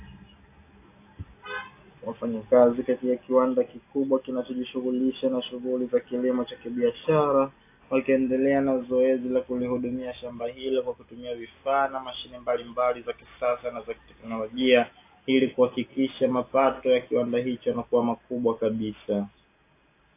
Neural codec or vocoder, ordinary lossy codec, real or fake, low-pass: none; AAC, 24 kbps; real; 3.6 kHz